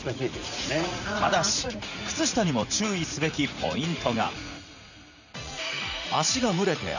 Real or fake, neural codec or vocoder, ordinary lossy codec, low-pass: fake; vocoder, 44.1 kHz, 80 mel bands, Vocos; none; 7.2 kHz